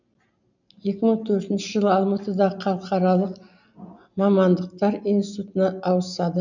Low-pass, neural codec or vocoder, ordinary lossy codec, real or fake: 7.2 kHz; none; none; real